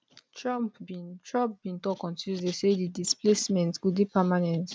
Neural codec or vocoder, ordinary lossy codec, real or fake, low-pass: none; none; real; none